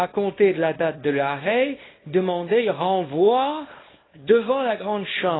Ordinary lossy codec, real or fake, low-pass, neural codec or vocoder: AAC, 16 kbps; fake; 7.2 kHz; codec, 24 kHz, 0.9 kbps, WavTokenizer, small release